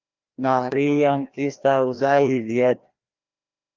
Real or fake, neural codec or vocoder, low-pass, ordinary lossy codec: fake; codec, 16 kHz, 1 kbps, FreqCodec, larger model; 7.2 kHz; Opus, 24 kbps